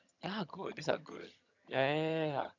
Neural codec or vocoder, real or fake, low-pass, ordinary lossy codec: vocoder, 22.05 kHz, 80 mel bands, HiFi-GAN; fake; 7.2 kHz; none